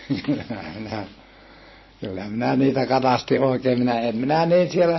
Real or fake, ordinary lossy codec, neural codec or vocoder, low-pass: real; MP3, 24 kbps; none; 7.2 kHz